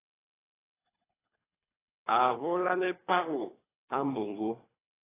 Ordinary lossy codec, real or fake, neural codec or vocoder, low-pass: AAC, 16 kbps; fake; codec, 24 kHz, 3 kbps, HILCodec; 3.6 kHz